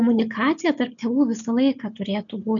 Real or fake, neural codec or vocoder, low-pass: real; none; 7.2 kHz